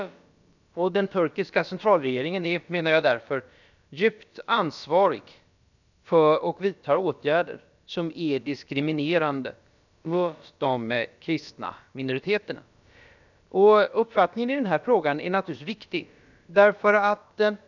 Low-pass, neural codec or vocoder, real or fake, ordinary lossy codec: 7.2 kHz; codec, 16 kHz, about 1 kbps, DyCAST, with the encoder's durations; fake; none